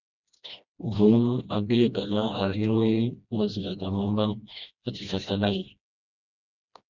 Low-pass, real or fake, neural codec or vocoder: 7.2 kHz; fake; codec, 16 kHz, 1 kbps, FreqCodec, smaller model